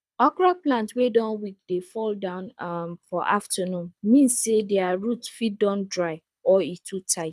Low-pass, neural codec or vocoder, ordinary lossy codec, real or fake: none; codec, 24 kHz, 6 kbps, HILCodec; none; fake